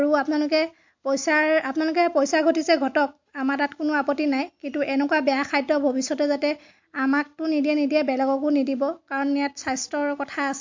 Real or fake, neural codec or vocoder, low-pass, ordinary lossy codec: real; none; 7.2 kHz; MP3, 48 kbps